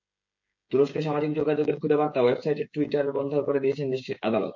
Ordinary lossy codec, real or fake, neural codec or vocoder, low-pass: MP3, 48 kbps; fake; codec, 16 kHz, 16 kbps, FreqCodec, smaller model; 7.2 kHz